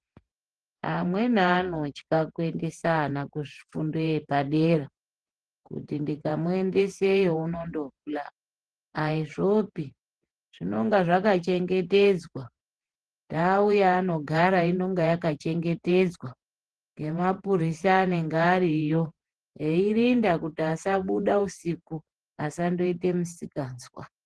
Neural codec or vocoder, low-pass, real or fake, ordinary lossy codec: vocoder, 48 kHz, 128 mel bands, Vocos; 10.8 kHz; fake; Opus, 16 kbps